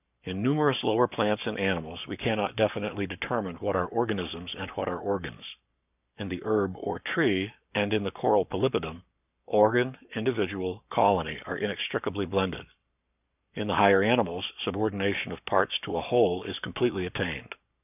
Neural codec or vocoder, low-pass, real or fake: codec, 44.1 kHz, 7.8 kbps, Pupu-Codec; 3.6 kHz; fake